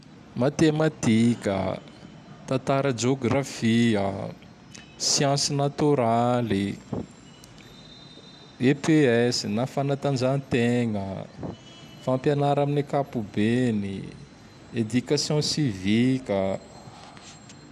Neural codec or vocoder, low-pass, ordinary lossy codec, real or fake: none; 14.4 kHz; none; real